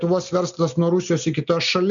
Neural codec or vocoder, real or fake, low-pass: none; real; 7.2 kHz